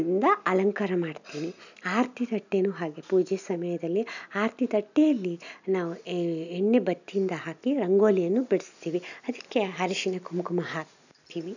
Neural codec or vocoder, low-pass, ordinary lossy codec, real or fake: none; 7.2 kHz; none; real